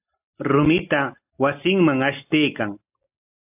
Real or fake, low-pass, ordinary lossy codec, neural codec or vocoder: real; 3.6 kHz; AAC, 32 kbps; none